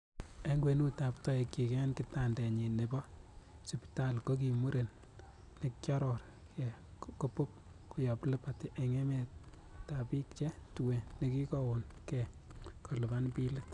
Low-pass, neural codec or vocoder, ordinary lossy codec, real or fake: 10.8 kHz; none; none; real